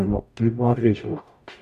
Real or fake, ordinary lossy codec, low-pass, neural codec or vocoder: fake; none; 14.4 kHz; codec, 44.1 kHz, 0.9 kbps, DAC